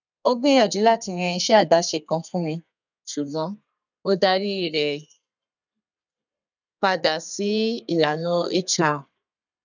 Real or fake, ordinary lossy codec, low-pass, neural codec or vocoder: fake; none; 7.2 kHz; codec, 32 kHz, 1.9 kbps, SNAC